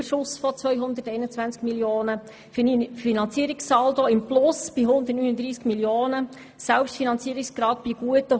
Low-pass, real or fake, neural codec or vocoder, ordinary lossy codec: none; real; none; none